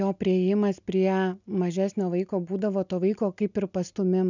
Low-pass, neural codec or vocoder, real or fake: 7.2 kHz; none; real